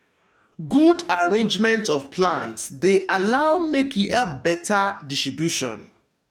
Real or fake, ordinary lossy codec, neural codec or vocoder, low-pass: fake; none; codec, 44.1 kHz, 2.6 kbps, DAC; 19.8 kHz